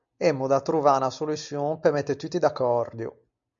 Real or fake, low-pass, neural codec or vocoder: real; 7.2 kHz; none